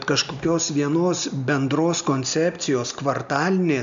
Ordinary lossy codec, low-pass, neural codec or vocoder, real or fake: MP3, 64 kbps; 7.2 kHz; none; real